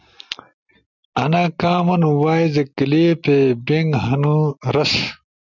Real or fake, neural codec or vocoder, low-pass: real; none; 7.2 kHz